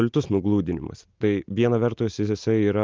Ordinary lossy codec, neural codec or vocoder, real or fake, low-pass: Opus, 24 kbps; none; real; 7.2 kHz